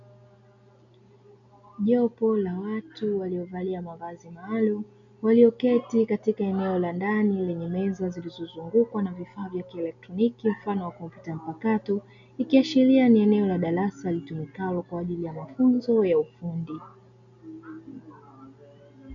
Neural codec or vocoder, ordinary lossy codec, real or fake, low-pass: none; MP3, 96 kbps; real; 7.2 kHz